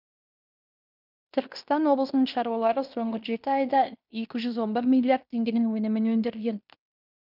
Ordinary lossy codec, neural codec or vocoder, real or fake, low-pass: none; codec, 16 kHz in and 24 kHz out, 0.9 kbps, LongCat-Audio-Codec, fine tuned four codebook decoder; fake; 5.4 kHz